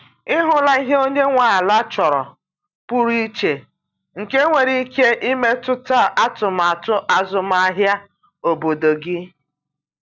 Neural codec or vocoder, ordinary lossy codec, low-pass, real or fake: none; none; 7.2 kHz; real